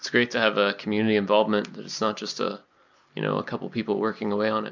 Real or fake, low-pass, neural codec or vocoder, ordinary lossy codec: real; 7.2 kHz; none; MP3, 64 kbps